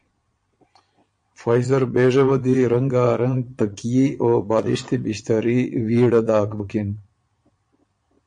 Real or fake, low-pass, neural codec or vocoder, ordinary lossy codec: fake; 9.9 kHz; vocoder, 22.05 kHz, 80 mel bands, Vocos; MP3, 48 kbps